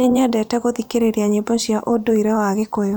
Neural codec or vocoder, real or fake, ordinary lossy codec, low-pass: vocoder, 44.1 kHz, 128 mel bands every 512 samples, BigVGAN v2; fake; none; none